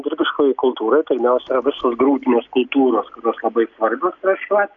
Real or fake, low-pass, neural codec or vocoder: real; 7.2 kHz; none